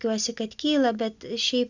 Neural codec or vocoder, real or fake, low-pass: none; real; 7.2 kHz